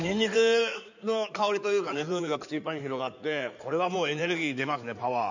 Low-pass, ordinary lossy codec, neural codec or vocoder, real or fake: 7.2 kHz; none; codec, 16 kHz in and 24 kHz out, 2.2 kbps, FireRedTTS-2 codec; fake